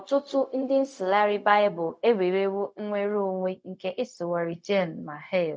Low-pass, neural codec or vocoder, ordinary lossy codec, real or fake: none; codec, 16 kHz, 0.4 kbps, LongCat-Audio-Codec; none; fake